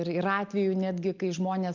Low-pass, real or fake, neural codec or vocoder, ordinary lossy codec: 7.2 kHz; real; none; Opus, 24 kbps